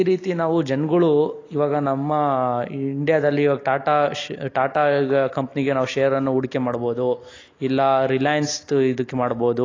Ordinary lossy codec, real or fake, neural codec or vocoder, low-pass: AAC, 32 kbps; real; none; 7.2 kHz